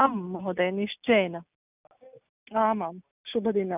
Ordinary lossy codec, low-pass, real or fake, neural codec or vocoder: none; 3.6 kHz; real; none